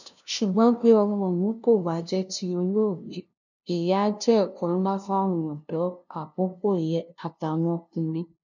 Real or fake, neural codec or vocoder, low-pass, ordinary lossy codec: fake; codec, 16 kHz, 0.5 kbps, FunCodec, trained on LibriTTS, 25 frames a second; 7.2 kHz; none